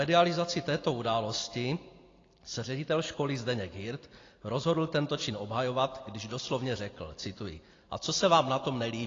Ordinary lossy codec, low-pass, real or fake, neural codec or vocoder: AAC, 32 kbps; 7.2 kHz; real; none